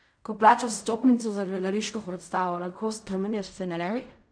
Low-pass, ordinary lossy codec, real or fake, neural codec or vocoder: 9.9 kHz; none; fake; codec, 16 kHz in and 24 kHz out, 0.4 kbps, LongCat-Audio-Codec, fine tuned four codebook decoder